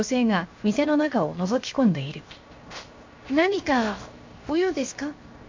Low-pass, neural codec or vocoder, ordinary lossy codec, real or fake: 7.2 kHz; codec, 16 kHz, 0.7 kbps, FocalCodec; MP3, 48 kbps; fake